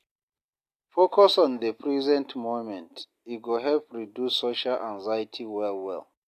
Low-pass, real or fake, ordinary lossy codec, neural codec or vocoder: 14.4 kHz; real; AAC, 64 kbps; none